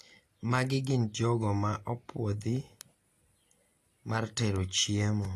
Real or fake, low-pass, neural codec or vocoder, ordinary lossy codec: real; 14.4 kHz; none; AAC, 48 kbps